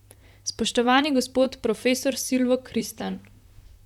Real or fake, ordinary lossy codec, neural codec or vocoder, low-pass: fake; none; vocoder, 44.1 kHz, 128 mel bands, Pupu-Vocoder; 19.8 kHz